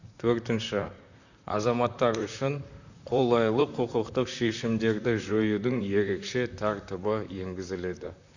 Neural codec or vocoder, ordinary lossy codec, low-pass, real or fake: vocoder, 44.1 kHz, 128 mel bands, Pupu-Vocoder; none; 7.2 kHz; fake